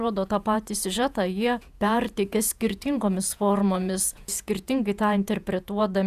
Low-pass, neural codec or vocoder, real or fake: 14.4 kHz; vocoder, 48 kHz, 128 mel bands, Vocos; fake